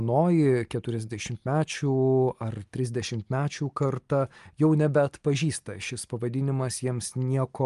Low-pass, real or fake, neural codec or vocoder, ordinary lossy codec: 10.8 kHz; real; none; Opus, 32 kbps